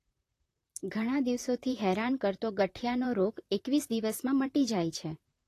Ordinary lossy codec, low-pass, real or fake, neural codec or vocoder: AAC, 48 kbps; 14.4 kHz; fake; vocoder, 44.1 kHz, 128 mel bands, Pupu-Vocoder